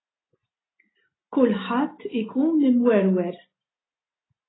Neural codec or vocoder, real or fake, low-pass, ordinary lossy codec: none; real; 7.2 kHz; AAC, 16 kbps